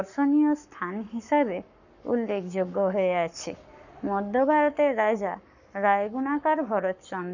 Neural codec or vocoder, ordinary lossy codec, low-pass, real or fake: codec, 44.1 kHz, 7.8 kbps, Pupu-Codec; none; 7.2 kHz; fake